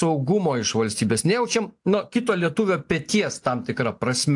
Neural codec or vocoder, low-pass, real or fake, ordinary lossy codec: none; 10.8 kHz; real; AAC, 64 kbps